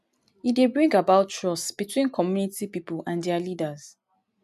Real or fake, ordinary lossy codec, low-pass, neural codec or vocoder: real; none; 14.4 kHz; none